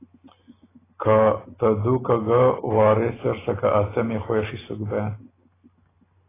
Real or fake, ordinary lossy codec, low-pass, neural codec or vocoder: real; AAC, 16 kbps; 3.6 kHz; none